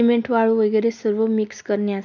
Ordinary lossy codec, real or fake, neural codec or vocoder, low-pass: none; real; none; 7.2 kHz